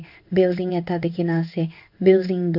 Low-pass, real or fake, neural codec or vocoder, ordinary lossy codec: 5.4 kHz; fake; codec, 16 kHz in and 24 kHz out, 1 kbps, XY-Tokenizer; none